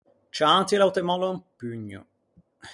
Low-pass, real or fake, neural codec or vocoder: 10.8 kHz; real; none